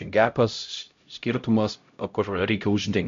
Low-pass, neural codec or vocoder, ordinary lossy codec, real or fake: 7.2 kHz; codec, 16 kHz, 0.5 kbps, X-Codec, HuBERT features, trained on LibriSpeech; MP3, 48 kbps; fake